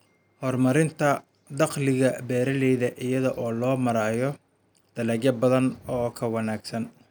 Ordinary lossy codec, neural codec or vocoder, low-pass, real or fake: none; none; none; real